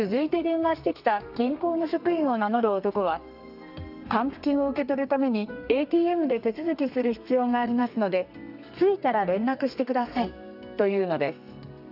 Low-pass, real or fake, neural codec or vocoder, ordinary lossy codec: 5.4 kHz; fake; codec, 32 kHz, 1.9 kbps, SNAC; none